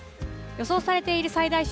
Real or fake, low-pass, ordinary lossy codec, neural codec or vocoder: real; none; none; none